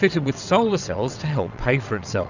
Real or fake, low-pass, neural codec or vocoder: fake; 7.2 kHz; vocoder, 22.05 kHz, 80 mel bands, WaveNeXt